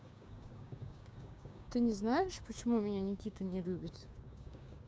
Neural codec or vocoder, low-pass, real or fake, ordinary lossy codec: codec, 16 kHz, 6 kbps, DAC; none; fake; none